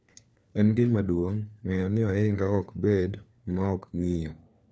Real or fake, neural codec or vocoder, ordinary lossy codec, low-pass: fake; codec, 16 kHz, 4 kbps, FunCodec, trained on LibriTTS, 50 frames a second; none; none